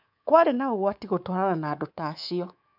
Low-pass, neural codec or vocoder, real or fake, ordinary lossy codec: 5.4 kHz; codec, 24 kHz, 3.1 kbps, DualCodec; fake; AAC, 48 kbps